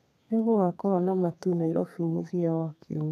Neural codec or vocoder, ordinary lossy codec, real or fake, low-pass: codec, 32 kHz, 1.9 kbps, SNAC; none; fake; 14.4 kHz